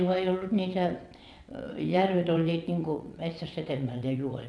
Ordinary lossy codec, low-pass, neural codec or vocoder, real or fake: none; none; vocoder, 22.05 kHz, 80 mel bands, WaveNeXt; fake